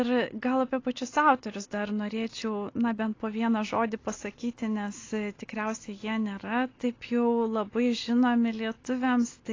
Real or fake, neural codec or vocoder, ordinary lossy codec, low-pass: real; none; AAC, 32 kbps; 7.2 kHz